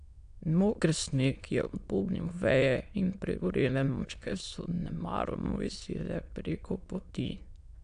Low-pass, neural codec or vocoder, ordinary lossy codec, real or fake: 9.9 kHz; autoencoder, 22.05 kHz, a latent of 192 numbers a frame, VITS, trained on many speakers; AAC, 96 kbps; fake